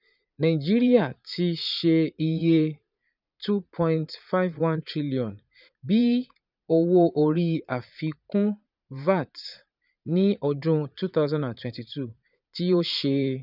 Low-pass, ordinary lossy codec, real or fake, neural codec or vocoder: 5.4 kHz; none; fake; vocoder, 44.1 kHz, 80 mel bands, Vocos